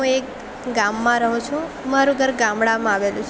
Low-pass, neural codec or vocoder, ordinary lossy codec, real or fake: none; none; none; real